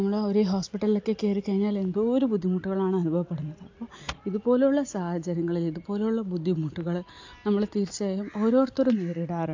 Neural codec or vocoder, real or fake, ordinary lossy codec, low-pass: none; real; AAC, 48 kbps; 7.2 kHz